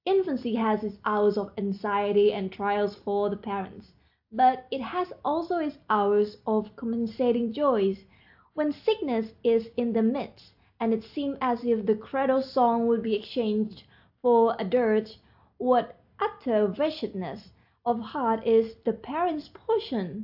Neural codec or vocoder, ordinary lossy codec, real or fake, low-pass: none; AAC, 48 kbps; real; 5.4 kHz